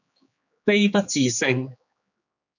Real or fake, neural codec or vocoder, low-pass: fake; codec, 16 kHz, 4 kbps, X-Codec, HuBERT features, trained on general audio; 7.2 kHz